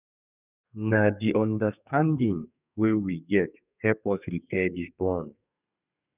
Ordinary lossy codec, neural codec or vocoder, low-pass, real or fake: none; codec, 32 kHz, 1.9 kbps, SNAC; 3.6 kHz; fake